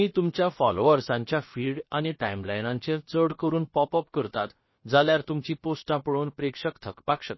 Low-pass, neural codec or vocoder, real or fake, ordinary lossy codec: 7.2 kHz; codec, 16 kHz, about 1 kbps, DyCAST, with the encoder's durations; fake; MP3, 24 kbps